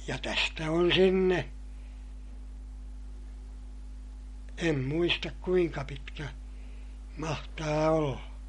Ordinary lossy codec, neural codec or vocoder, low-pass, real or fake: MP3, 48 kbps; none; 19.8 kHz; real